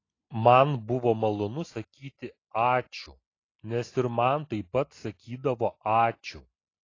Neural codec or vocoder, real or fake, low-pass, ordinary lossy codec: none; real; 7.2 kHz; AAC, 32 kbps